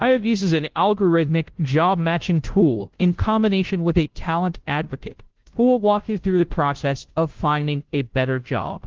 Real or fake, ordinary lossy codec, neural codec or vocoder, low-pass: fake; Opus, 16 kbps; codec, 16 kHz, 0.5 kbps, FunCodec, trained on Chinese and English, 25 frames a second; 7.2 kHz